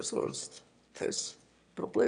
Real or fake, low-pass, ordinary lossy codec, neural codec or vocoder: fake; 9.9 kHz; none; autoencoder, 22.05 kHz, a latent of 192 numbers a frame, VITS, trained on one speaker